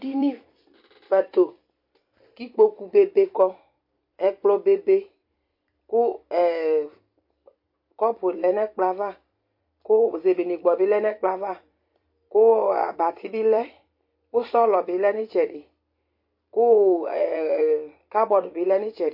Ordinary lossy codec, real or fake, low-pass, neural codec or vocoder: MP3, 32 kbps; fake; 5.4 kHz; vocoder, 44.1 kHz, 128 mel bands every 256 samples, BigVGAN v2